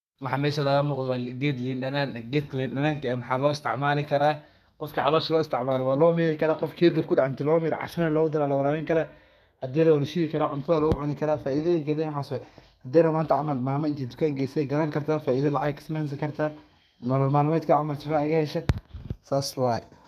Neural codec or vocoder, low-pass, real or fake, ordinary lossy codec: codec, 32 kHz, 1.9 kbps, SNAC; 14.4 kHz; fake; none